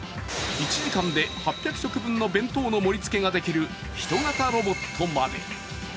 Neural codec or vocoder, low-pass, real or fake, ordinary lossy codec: none; none; real; none